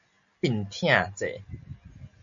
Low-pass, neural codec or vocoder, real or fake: 7.2 kHz; none; real